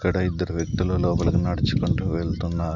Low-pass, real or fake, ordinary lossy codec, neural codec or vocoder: 7.2 kHz; real; none; none